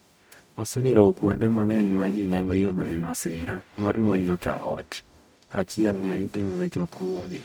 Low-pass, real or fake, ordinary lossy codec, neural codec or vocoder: none; fake; none; codec, 44.1 kHz, 0.9 kbps, DAC